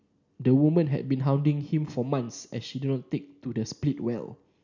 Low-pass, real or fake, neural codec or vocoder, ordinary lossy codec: 7.2 kHz; real; none; none